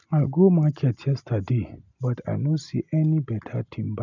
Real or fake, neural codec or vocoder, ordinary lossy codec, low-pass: real; none; none; 7.2 kHz